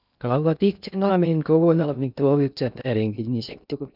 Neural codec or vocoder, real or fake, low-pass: codec, 16 kHz in and 24 kHz out, 0.6 kbps, FocalCodec, streaming, 2048 codes; fake; 5.4 kHz